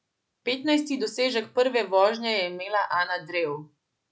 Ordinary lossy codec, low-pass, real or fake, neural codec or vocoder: none; none; real; none